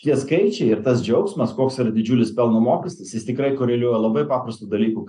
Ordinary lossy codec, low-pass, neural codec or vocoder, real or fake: AAC, 64 kbps; 10.8 kHz; none; real